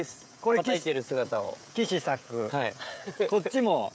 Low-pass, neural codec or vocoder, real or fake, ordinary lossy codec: none; codec, 16 kHz, 16 kbps, FreqCodec, smaller model; fake; none